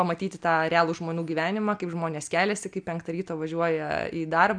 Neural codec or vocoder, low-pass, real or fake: none; 9.9 kHz; real